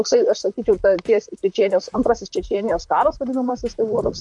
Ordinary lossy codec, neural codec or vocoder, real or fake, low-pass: MP3, 64 kbps; vocoder, 44.1 kHz, 128 mel bands, Pupu-Vocoder; fake; 10.8 kHz